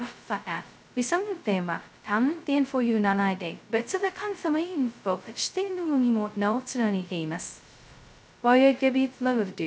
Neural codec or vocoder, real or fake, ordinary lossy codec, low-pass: codec, 16 kHz, 0.2 kbps, FocalCodec; fake; none; none